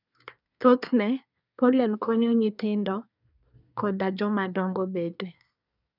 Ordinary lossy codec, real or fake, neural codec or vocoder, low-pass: none; fake; codec, 24 kHz, 1 kbps, SNAC; 5.4 kHz